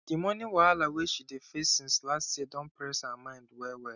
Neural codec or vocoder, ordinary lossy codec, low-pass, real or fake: none; none; 7.2 kHz; real